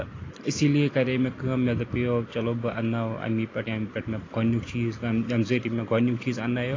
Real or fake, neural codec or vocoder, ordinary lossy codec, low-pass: real; none; AAC, 32 kbps; 7.2 kHz